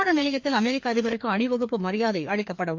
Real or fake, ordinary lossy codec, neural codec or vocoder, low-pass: fake; MP3, 48 kbps; codec, 16 kHz, 2 kbps, FreqCodec, larger model; 7.2 kHz